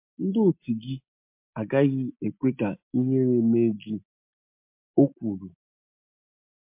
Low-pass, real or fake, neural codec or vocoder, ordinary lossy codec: 3.6 kHz; real; none; MP3, 32 kbps